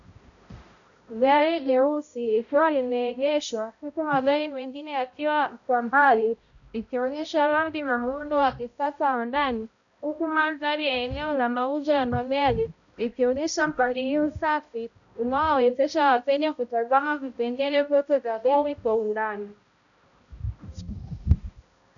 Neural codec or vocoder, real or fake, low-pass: codec, 16 kHz, 0.5 kbps, X-Codec, HuBERT features, trained on balanced general audio; fake; 7.2 kHz